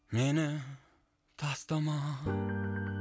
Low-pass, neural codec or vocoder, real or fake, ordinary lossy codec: none; none; real; none